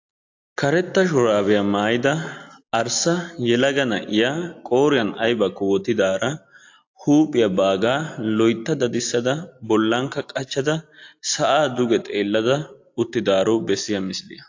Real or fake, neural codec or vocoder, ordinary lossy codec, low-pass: real; none; AAC, 48 kbps; 7.2 kHz